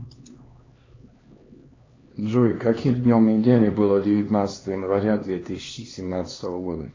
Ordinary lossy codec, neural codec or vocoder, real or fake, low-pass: AAC, 32 kbps; codec, 16 kHz, 2 kbps, X-Codec, HuBERT features, trained on LibriSpeech; fake; 7.2 kHz